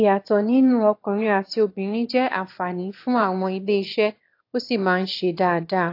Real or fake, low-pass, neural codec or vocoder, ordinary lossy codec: fake; 5.4 kHz; autoencoder, 22.05 kHz, a latent of 192 numbers a frame, VITS, trained on one speaker; AAC, 32 kbps